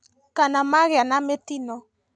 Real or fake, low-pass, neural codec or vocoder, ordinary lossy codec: real; none; none; none